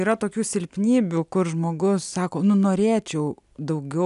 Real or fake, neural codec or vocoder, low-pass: real; none; 10.8 kHz